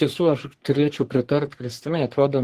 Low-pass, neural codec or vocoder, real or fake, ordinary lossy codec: 14.4 kHz; codec, 44.1 kHz, 3.4 kbps, Pupu-Codec; fake; Opus, 16 kbps